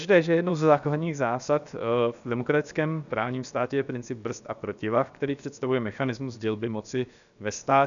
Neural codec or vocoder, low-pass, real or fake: codec, 16 kHz, 0.7 kbps, FocalCodec; 7.2 kHz; fake